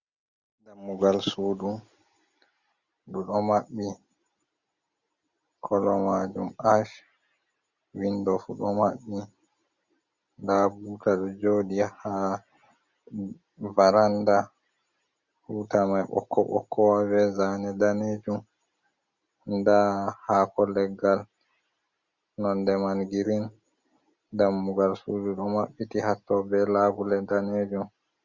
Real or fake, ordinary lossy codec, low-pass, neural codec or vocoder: real; Opus, 64 kbps; 7.2 kHz; none